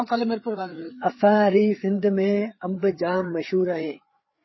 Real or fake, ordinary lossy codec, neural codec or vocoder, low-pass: fake; MP3, 24 kbps; codec, 16 kHz, 4 kbps, FreqCodec, larger model; 7.2 kHz